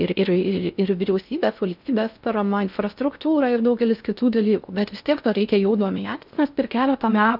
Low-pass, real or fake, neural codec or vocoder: 5.4 kHz; fake; codec, 16 kHz in and 24 kHz out, 0.6 kbps, FocalCodec, streaming, 4096 codes